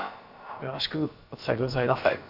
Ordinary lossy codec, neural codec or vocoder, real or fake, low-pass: AAC, 48 kbps; codec, 16 kHz, about 1 kbps, DyCAST, with the encoder's durations; fake; 5.4 kHz